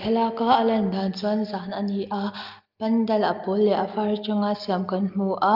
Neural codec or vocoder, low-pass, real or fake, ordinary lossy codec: none; 5.4 kHz; real; Opus, 24 kbps